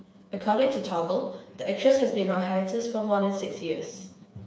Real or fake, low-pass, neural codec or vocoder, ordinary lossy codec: fake; none; codec, 16 kHz, 4 kbps, FreqCodec, smaller model; none